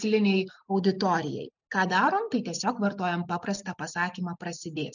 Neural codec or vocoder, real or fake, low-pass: none; real; 7.2 kHz